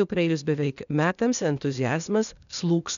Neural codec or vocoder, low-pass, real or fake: codec, 16 kHz, 0.8 kbps, ZipCodec; 7.2 kHz; fake